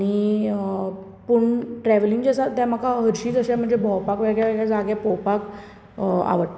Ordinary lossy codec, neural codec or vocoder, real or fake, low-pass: none; none; real; none